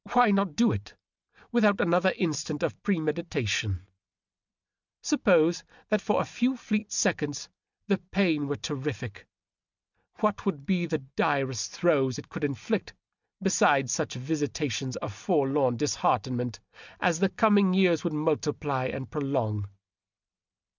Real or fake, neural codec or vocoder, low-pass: real; none; 7.2 kHz